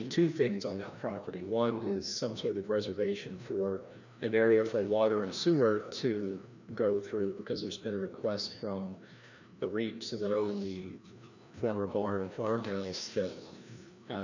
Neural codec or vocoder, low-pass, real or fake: codec, 16 kHz, 1 kbps, FreqCodec, larger model; 7.2 kHz; fake